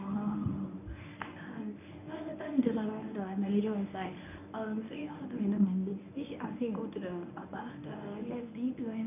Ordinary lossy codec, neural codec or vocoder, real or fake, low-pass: none; codec, 24 kHz, 0.9 kbps, WavTokenizer, medium speech release version 1; fake; 3.6 kHz